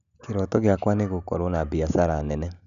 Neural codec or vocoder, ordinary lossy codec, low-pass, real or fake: none; none; 7.2 kHz; real